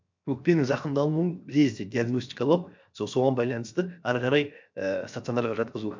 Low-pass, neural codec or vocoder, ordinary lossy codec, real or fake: 7.2 kHz; codec, 16 kHz, 0.7 kbps, FocalCodec; MP3, 64 kbps; fake